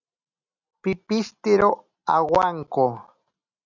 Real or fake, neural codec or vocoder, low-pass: real; none; 7.2 kHz